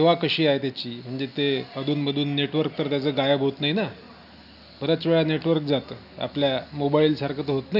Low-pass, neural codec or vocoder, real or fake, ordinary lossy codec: 5.4 kHz; none; real; none